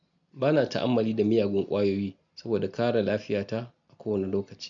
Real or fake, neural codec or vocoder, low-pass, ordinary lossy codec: real; none; 7.2 kHz; MP3, 64 kbps